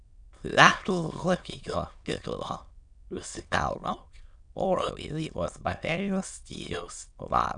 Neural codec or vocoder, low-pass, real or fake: autoencoder, 22.05 kHz, a latent of 192 numbers a frame, VITS, trained on many speakers; 9.9 kHz; fake